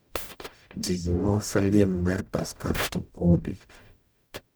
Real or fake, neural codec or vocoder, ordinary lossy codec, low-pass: fake; codec, 44.1 kHz, 0.9 kbps, DAC; none; none